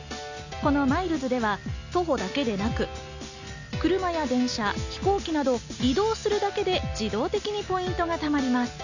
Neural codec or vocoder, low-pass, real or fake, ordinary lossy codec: none; 7.2 kHz; real; none